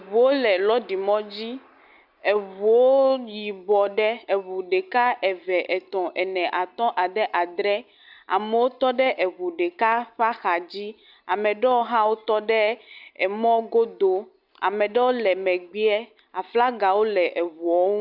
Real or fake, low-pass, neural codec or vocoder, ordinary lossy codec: real; 5.4 kHz; none; Opus, 64 kbps